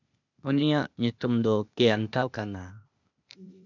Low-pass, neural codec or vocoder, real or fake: 7.2 kHz; codec, 16 kHz, 0.8 kbps, ZipCodec; fake